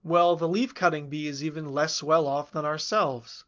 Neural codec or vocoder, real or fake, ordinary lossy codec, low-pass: none; real; Opus, 32 kbps; 7.2 kHz